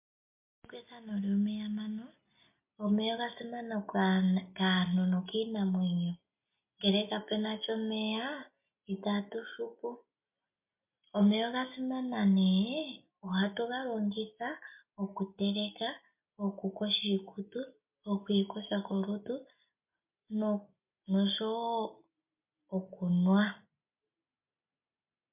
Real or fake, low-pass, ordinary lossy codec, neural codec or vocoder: real; 3.6 kHz; MP3, 32 kbps; none